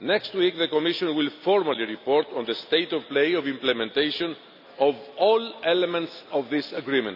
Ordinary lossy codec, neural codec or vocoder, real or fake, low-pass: none; none; real; 5.4 kHz